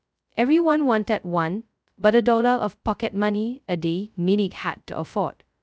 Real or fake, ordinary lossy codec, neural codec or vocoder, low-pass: fake; none; codec, 16 kHz, 0.2 kbps, FocalCodec; none